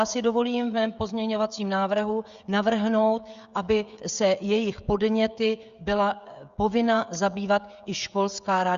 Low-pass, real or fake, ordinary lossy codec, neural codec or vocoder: 7.2 kHz; fake; Opus, 64 kbps; codec, 16 kHz, 16 kbps, FreqCodec, smaller model